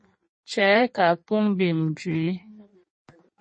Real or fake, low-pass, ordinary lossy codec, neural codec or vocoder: fake; 9.9 kHz; MP3, 32 kbps; codec, 16 kHz in and 24 kHz out, 1.1 kbps, FireRedTTS-2 codec